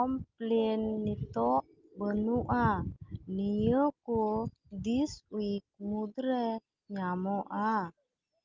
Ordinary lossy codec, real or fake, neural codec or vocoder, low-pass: Opus, 32 kbps; real; none; 7.2 kHz